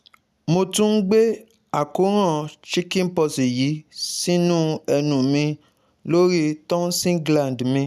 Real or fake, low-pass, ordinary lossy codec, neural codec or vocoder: real; 14.4 kHz; none; none